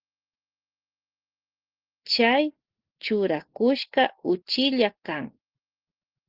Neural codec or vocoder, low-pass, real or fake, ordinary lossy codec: none; 5.4 kHz; real; Opus, 16 kbps